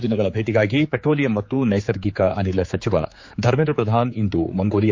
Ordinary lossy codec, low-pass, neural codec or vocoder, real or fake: MP3, 48 kbps; 7.2 kHz; codec, 16 kHz, 4 kbps, X-Codec, HuBERT features, trained on general audio; fake